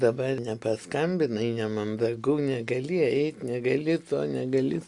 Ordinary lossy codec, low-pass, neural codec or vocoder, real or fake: Opus, 64 kbps; 10.8 kHz; none; real